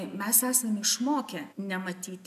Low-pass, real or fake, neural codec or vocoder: 14.4 kHz; real; none